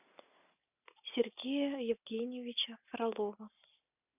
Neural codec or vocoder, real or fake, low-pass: none; real; 3.6 kHz